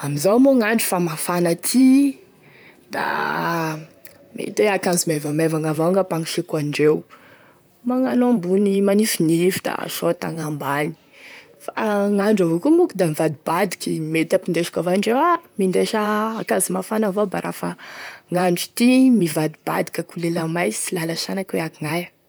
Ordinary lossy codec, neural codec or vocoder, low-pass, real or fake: none; vocoder, 44.1 kHz, 128 mel bands, Pupu-Vocoder; none; fake